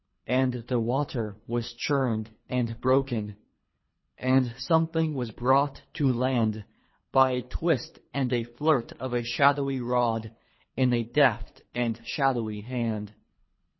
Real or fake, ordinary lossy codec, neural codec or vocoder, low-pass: fake; MP3, 24 kbps; codec, 24 kHz, 3 kbps, HILCodec; 7.2 kHz